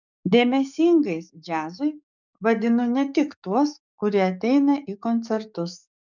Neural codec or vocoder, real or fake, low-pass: codec, 44.1 kHz, 7.8 kbps, DAC; fake; 7.2 kHz